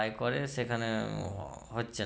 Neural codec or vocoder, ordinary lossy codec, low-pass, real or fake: none; none; none; real